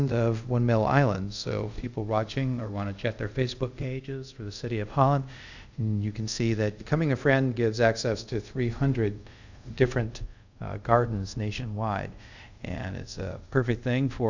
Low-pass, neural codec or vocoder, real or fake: 7.2 kHz; codec, 24 kHz, 0.5 kbps, DualCodec; fake